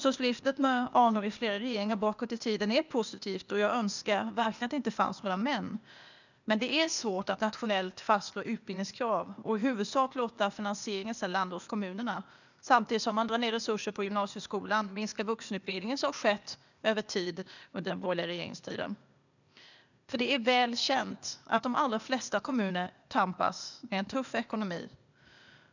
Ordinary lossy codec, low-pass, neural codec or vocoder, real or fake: none; 7.2 kHz; codec, 16 kHz, 0.8 kbps, ZipCodec; fake